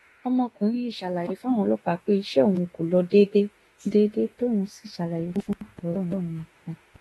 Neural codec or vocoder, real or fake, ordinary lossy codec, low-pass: autoencoder, 48 kHz, 32 numbers a frame, DAC-VAE, trained on Japanese speech; fake; AAC, 32 kbps; 19.8 kHz